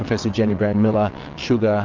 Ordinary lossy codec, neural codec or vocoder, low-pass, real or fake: Opus, 24 kbps; vocoder, 22.05 kHz, 80 mel bands, WaveNeXt; 7.2 kHz; fake